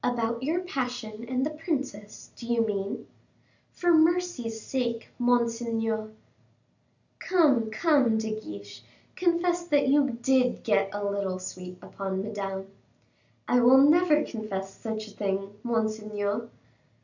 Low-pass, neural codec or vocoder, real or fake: 7.2 kHz; none; real